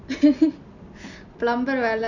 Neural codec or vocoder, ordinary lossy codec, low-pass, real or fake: none; none; 7.2 kHz; real